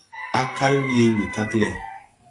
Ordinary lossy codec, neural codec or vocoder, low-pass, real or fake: AAC, 64 kbps; codec, 44.1 kHz, 2.6 kbps, SNAC; 10.8 kHz; fake